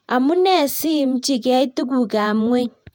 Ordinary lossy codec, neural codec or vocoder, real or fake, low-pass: MP3, 96 kbps; vocoder, 48 kHz, 128 mel bands, Vocos; fake; 19.8 kHz